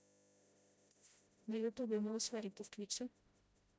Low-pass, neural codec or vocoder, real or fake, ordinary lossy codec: none; codec, 16 kHz, 0.5 kbps, FreqCodec, smaller model; fake; none